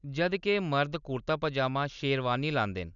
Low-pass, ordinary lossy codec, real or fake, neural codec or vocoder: 7.2 kHz; none; real; none